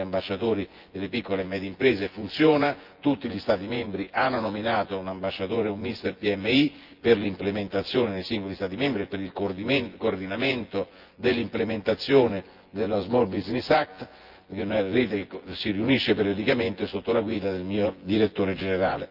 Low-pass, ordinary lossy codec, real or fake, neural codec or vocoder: 5.4 kHz; Opus, 32 kbps; fake; vocoder, 24 kHz, 100 mel bands, Vocos